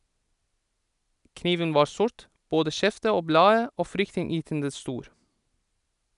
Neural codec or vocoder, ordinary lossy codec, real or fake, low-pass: none; none; real; 10.8 kHz